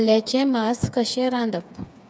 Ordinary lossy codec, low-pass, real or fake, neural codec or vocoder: none; none; fake; codec, 16 kHz, 4 kbps, FreqCodec, smaller model